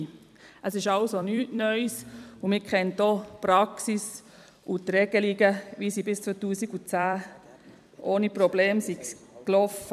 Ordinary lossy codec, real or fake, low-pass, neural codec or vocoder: none; fake; 14.4 kHz; vocoder, 44.1 kHz, 128 mel bands every 256 samples, BigVGAN v2